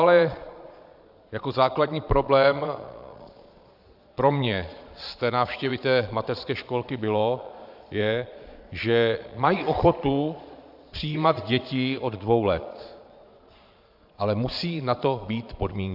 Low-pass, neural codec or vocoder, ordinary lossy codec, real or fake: 5.4 kHz; vocoder, 22.05 kHz, 80 mel bands, Vocos; AAC, 48 kbps; fake